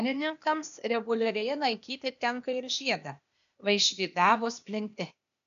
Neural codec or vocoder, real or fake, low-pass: codec, 16 kHz, 0.8 kbps, ZipCodec; fake; 7.2 kHz